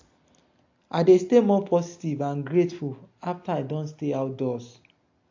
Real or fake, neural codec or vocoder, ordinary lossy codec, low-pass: real; none; AAC, 48 kbps; 7.2 kHz